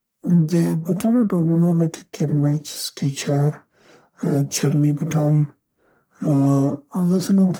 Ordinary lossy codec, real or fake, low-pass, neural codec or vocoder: none; fake; none; codec, 44.1 kHz, 1.7 kbps, Pupu-Codec